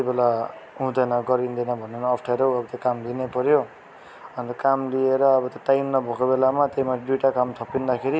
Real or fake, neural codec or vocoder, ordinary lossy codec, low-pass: real; none; none; none